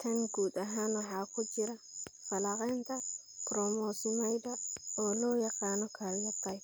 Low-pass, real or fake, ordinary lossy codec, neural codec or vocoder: none; real; none; none